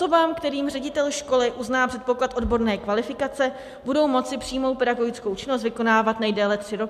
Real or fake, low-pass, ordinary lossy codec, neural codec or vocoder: real; 14.4 kHz; MP3, 96 kbps; none